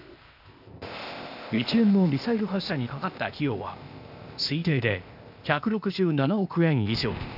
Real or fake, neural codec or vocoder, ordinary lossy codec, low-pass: fake; codec, 16 kHz, 0.8 kbps, ZipCodec; none; 5.4 kHz